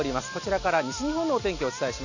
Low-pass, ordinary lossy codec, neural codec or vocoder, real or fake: 7.2 kHz; none; none; real